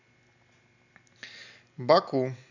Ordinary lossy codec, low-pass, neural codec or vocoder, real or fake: none; 7.2 kHz; none; real